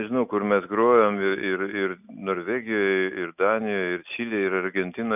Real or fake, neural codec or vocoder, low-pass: real; none; 3.6 kHz